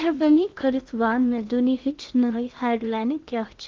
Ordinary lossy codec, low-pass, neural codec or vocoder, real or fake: Opus, 32 kbps; 7.2 kHz; codec, 16 kHz in and 24 kHz out, 0.8 kbps, FocalCodec, streaming, 65536 codes; fake